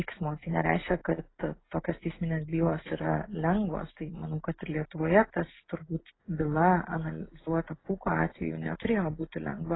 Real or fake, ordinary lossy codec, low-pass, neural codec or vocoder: real; AAC, 16 kbps; 7.2 kHz; none